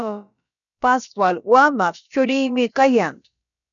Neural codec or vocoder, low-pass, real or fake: codec, 16 kHz, about 1 kbps, DyCAST, with the encoder's durations; 7.2 kHz; fake